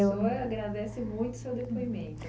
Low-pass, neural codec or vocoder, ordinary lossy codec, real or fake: none; none; none; real